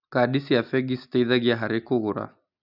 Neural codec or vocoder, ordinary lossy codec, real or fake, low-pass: none; none; real; 5.4 kHz